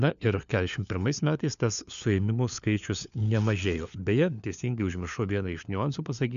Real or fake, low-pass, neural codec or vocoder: fake; 7.2 kHz; codec, 16 kHz, 4 kbps, FunCodec, trained on LibriTTS, 50 frames a second